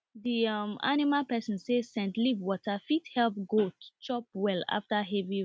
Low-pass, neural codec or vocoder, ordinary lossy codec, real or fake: none; none; none; real